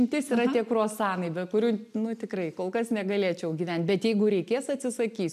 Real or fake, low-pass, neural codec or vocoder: real; 14.4 kHz; none